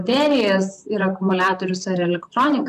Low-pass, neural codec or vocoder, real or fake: 14.4 kHz; vocoder, 44.1 kHz, 128 mel bands, Pupu-Vocoder; fake